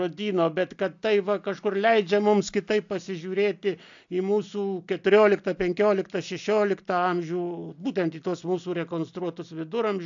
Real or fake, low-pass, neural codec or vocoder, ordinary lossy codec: real; 7.2 kHz; none; AAC, 64 kbps